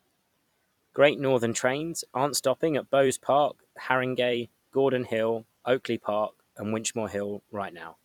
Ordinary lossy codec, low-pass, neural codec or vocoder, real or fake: none; 19.8 kHz; vocoder, 44.1 kHz, 128 mel bands every 256 samples, BigVGAN v2; fake